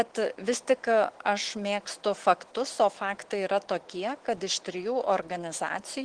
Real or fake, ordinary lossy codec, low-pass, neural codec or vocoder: fake; Opus, 16 kbps; 9.9 kHz; autoencoder, 48 kHz, 128 numbers a frame, DAC-VAE, trained on Japanese speech